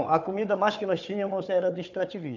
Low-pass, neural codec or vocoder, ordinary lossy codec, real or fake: 7.2 kHz; codec, 16 kHz, 4 kbps, FunCodec, trained on Chinese and English, 50 frames a second; none; fake